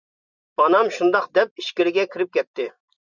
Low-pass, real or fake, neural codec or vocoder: 7.2 kHz; real; none